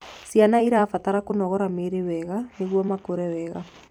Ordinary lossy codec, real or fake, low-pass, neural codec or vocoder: none; fake; 19.8 kHz; vocoder, 44.1 kHz, 128 mel bands every 256 samples, BigVGAN v2